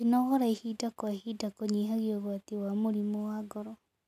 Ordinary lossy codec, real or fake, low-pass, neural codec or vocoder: none; real; 14.4 kHz; none